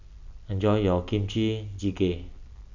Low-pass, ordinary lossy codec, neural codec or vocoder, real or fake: 7.2 kHz; none; none; real